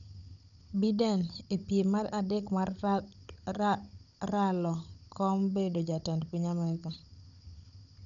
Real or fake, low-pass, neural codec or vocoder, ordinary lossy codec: fake; 7.2 kHz; codec, 16 kHz, 16 kbps, FunCodec, trained on Chinese and English, 50 frames a second; none